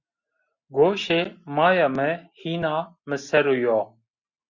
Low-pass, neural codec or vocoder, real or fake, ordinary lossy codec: 7.2 kHz; none; real; Opus, 64 kbps